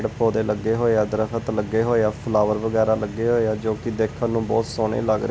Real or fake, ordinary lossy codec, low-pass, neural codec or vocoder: real; none; none; none